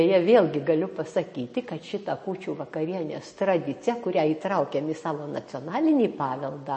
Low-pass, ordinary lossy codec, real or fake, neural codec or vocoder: 10.8 kHz; MP3, 32 kbps; real; none